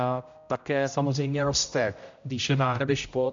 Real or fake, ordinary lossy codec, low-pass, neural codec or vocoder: fake; MP3, 64 kbps; 7.2 kHz; codec, 16 kHz, 0.5 kbps, X-Codec, HuBERT features, trained on general audio